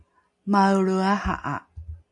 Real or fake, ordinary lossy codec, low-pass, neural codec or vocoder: real; AAC, 64 kbps; 10.8 kHz; none